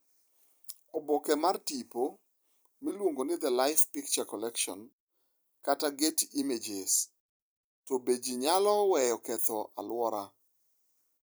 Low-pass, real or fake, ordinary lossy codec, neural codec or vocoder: none; fake; none; vocoder, 44.1 kHz, 128 mel bands every 256 samples, BigVGAN v2